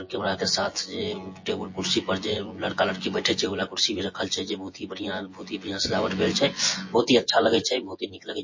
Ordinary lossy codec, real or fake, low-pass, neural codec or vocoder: MP3, 32 kbps; fake; 7.2 kHz; vocoder, 24 kHz, 100 mel bands, Vocos